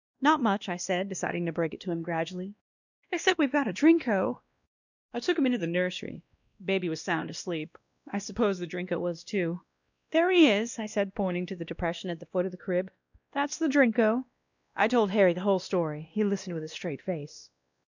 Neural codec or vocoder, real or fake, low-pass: codec, 16 kHz, 1 kbps, X-Codec, WavLM features, trained on Multilingual LibriSpeech; fake; 7.2 kHz